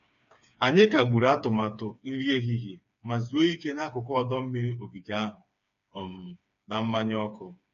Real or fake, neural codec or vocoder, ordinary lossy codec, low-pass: fake; codec, 16 kHz, 4 kbps, FreqCodec, smaller model; none; 7.2 kHz